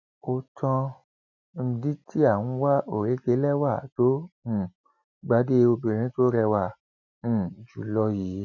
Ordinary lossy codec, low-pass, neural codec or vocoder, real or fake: none; 7.2 kHz; none; real